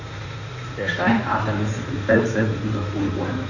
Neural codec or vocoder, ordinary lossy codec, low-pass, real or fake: codec, 16 kHz in and 24 kHz out, 2.2 kbps, FireRedTTS-2 codec; none; 7.2 kHz; fake